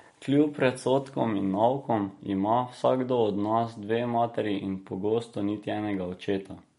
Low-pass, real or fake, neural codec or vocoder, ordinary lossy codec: 10.8 kHz; real; none; MP3, 48 kbps